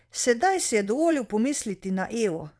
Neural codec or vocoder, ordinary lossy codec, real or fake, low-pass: vocoder, 22.05 kHz, 80 mel bands, WaveNeXt; none; fake; none